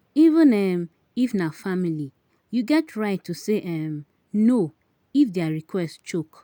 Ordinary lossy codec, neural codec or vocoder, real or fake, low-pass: none; none; real; 19.8 kHz